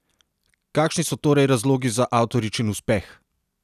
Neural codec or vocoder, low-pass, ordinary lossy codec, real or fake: none; 14.4 kHz; none; real